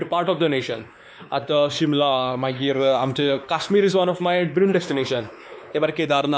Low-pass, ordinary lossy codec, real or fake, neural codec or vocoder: none; none; fake; codec, 16 kHz, 2 kbps, X-Codec, WavLM features, trained on Multilingual LibriSpeech